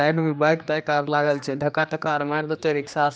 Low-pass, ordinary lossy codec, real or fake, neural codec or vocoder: none; none; fake; codec, 16 kHz, 2 kbps, X-Codec, HuBERT features, trained on general audio